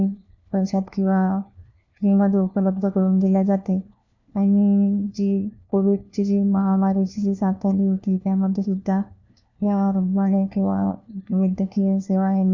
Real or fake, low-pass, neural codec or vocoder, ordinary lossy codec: fake; 7.2 kHz; codec, 16 kHz, 1 kbps, FunCodec, trained on LibriTTS, 50 frames a second; none